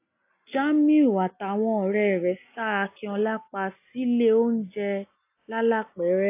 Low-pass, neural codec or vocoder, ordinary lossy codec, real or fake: 3.6 kHz; none; AAC, 24 kbps; real